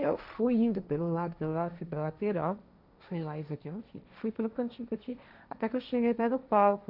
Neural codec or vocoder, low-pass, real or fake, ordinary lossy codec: codec, 16 kHz, 1.1 kbps, Voila-Tokenizer; 5.4 kHz; fake; none